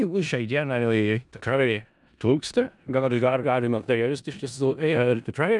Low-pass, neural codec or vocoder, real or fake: 10.8 kHz; codec, 16 kHz in and 24 kHz out, 0.4 kbps, LongCat-Audio-Codec, four codebook decoder; fake